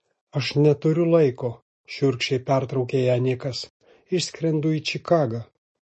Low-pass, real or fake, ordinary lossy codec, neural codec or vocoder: 10.8 kHz; real; MP3, 32 kbps; none